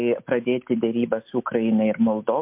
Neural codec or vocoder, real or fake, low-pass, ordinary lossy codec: none; real; 3.6 kHz; MP3, 32 kbps